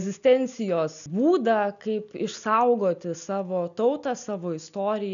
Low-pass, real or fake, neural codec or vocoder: 7.2 kHz; real; none